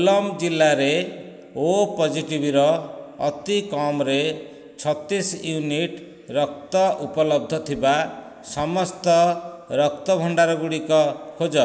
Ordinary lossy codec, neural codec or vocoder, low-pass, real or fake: none; none; none; real